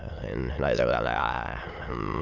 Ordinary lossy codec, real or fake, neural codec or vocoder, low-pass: none; fake; autoencoder, 22.05 kHz, a latent of 192 numbers a frame, VITS, trained on many speakers; 7.2 kHz